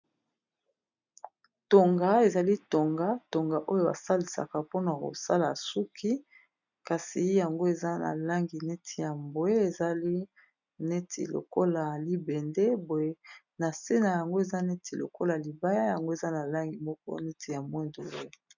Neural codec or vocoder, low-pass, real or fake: none; 7.2 kHz; real